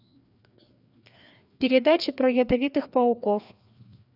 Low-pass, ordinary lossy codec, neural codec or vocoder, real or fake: 5.4 kHz; none; codec, 16 kHz, 2 kbps, FreqCodec, larger model; fake